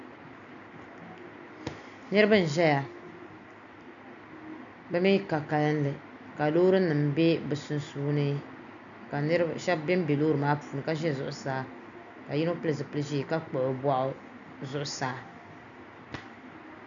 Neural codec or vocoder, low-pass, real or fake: none; 7.2 kHz; real